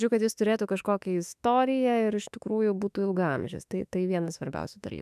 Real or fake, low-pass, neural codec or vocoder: fake; 14.4 kHz; autoencoder, 48 kHz, 32 numbers a frame, DAC-VAE, trained on Japanese speech